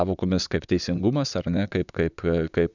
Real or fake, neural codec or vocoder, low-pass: fake; vocoder, 44.1 kHz, 128 mel bands, Pupu-Vocoder; 7.2 kHz